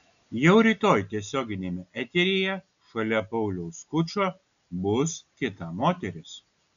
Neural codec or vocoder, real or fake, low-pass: none; real; 7.2 kHz